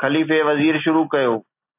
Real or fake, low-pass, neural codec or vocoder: real; 3.6 kHz; none